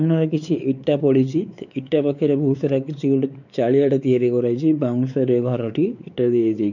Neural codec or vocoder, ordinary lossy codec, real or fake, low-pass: codec, 16 kHz, 4 kbps, X-Codec, WavLM features, trained on Multilingual LibriSpeech; none; fake; 7.2 kHz